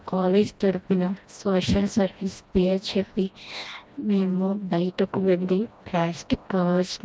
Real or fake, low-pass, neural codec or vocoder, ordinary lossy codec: fake; none; codec, 16 kHz, 1 kbps, FreqCodec, smaller model; none